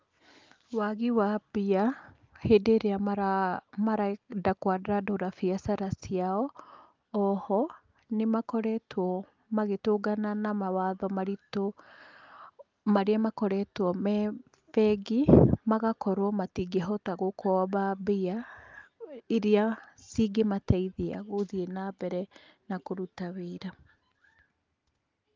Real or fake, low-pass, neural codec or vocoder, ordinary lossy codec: real; 7.2 kHz; none; Opus, 32 kbps